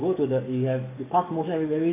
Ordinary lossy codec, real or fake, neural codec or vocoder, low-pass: none; fake; codec, 44.1 kHz, 7.8 kbps, DAC; 3.6 kHz